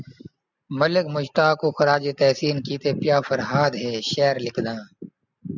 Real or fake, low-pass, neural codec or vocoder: real; 7.2 kHz; none